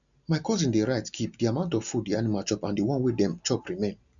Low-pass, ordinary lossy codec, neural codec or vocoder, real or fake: 7.2 kHz; none; none; real